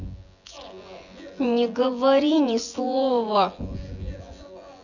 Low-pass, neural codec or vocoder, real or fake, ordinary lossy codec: 7.2 kHz; vocoder, 24 kHz, 100 mel bands, Vocos; fake; none